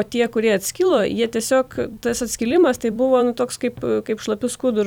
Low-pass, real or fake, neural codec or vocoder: 19.8 kHz; fake; vocoder, 44.1 kHz, 128 mel bands every 256 samples, BigVGAN v2